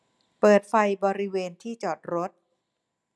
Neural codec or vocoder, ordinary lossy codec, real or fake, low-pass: none; none; real; none